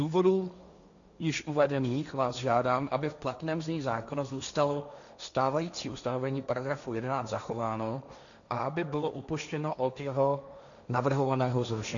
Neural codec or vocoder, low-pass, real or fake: codec, 16 kHz, 1.1 kbps, Voila-Tokenizer; 7.2 kHz; fake